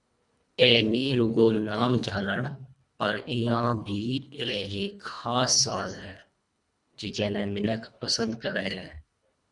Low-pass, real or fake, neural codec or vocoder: 10.8 kHz; fake; codec, 24 kHz, 1.5 kbps, HILCodec